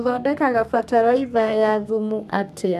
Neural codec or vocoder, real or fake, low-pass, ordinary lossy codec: codec, 32 kHz, 1.9 kbps, SNAC; fake; 14.4 kHz; none